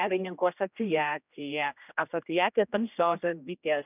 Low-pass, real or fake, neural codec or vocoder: 3.6 kHz; fake; codec, 16 kHz, 1 kbps, X-Codec, HuBERT features, trained on general audio